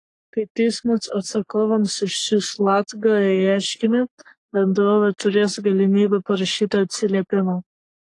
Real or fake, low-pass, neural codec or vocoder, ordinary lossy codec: fake; 10.8 kHz; codec, 44.1 kHz, 3.4 kbps, Pupu-Codec; AAC, 48 kbps